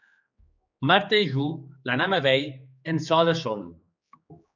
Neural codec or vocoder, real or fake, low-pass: codec, 16 kHz, 4 kbps, X-Codec, HuBERT features, trained on general audio; fake; 7.2 kHz